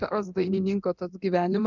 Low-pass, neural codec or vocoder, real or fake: 7.2 kHz; codec, 24 kHz, 0.9 kbps, DualCodec; fake